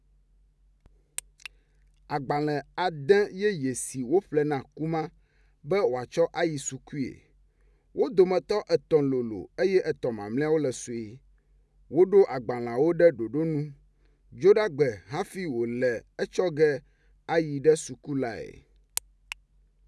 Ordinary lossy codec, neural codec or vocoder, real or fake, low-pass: none; none; real; none